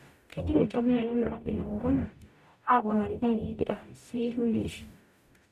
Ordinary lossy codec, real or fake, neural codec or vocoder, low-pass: none; fake; codec, 44.1 kHz, 0.9 kbps, DAC; 14.4 kHz